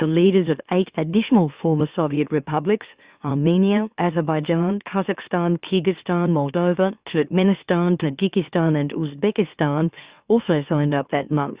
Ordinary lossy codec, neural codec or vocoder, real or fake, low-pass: Opus, 64 kbps; autoencoder, 44.1 kHz, a latent of 192 numbers a frame, MeloTTS; fake; 3.6 kHz